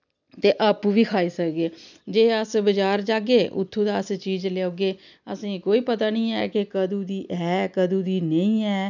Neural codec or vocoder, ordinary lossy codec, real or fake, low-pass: none; none; real; 7.2 kHz